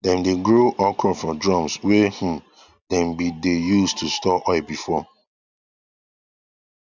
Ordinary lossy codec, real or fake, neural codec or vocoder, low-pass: none; real; none; 7.2 kHz